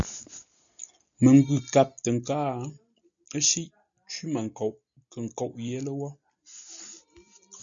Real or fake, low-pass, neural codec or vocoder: real; 7.2 kHz; none